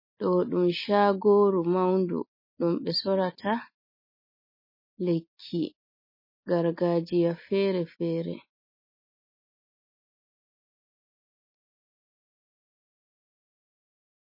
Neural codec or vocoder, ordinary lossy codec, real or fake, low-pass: none; MP3, 24 kbps; real; 5.4 kHz